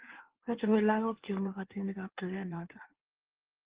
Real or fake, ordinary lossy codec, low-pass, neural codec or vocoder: fake; Opus, 16 kbps; 3.6 kHz; codec, 16 kHz in and 24 kHz out, 1.1 kbps, FireRedTTS-2 codec